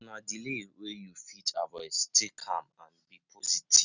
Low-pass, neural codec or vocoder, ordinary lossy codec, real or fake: 7.2 kHz; none; none; real